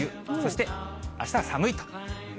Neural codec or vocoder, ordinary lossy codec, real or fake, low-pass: none; none; real; none